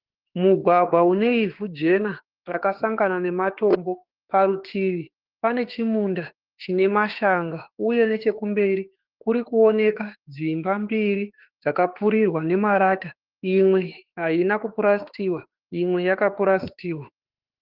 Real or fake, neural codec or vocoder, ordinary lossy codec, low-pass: fake; autoencoder, 48 kHz, 32 numbers a frame, DAC-VAE, trained on Japanese speech; Opus, 16 kbps; 5.4 kHz